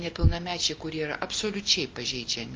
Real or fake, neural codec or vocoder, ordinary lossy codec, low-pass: real; none; Opus, 24 kbps; 7.2 kHz